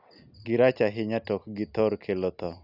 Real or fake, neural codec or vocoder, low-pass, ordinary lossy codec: real; none; 5.4 kHz; none